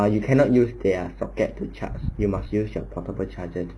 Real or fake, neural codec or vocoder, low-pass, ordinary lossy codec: real; none; none; none